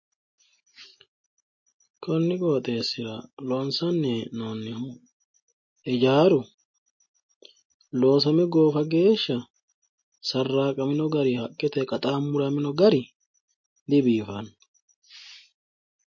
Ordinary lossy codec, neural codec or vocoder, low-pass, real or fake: MP3, 32 kbps; none; 7.2 kHz; real